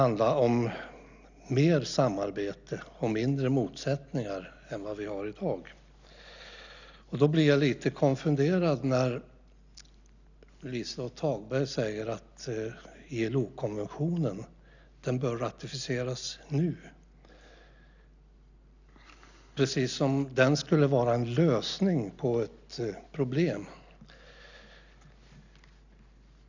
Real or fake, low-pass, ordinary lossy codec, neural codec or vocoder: real; 7.2 kHz; none; none